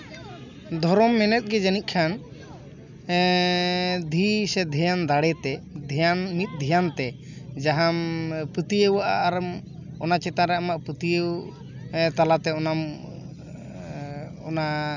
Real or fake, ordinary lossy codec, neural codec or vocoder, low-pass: real; none; none; 7.2 kHz